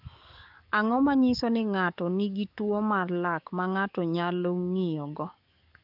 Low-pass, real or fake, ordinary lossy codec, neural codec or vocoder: 5.4 kHz; fake; none; codec, 44.1 kHz, 7.8 kbps, DAC